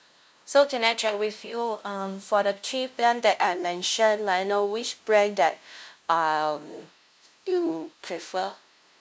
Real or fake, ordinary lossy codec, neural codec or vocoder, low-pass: fake; none; codec, 16 kHz, 0.5 kbps, FunCodec, trained on LibriTTS, 25 frames a second; none